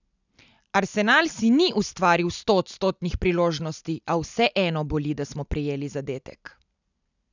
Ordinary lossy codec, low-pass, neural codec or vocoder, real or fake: none; 7.2 kHz; none; real